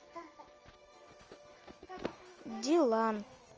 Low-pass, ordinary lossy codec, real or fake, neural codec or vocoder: 7.2 kHz; Opus, 24 kbps; real; none